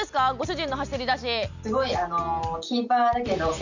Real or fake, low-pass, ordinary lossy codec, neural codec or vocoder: real; 7.2 kHz; none; none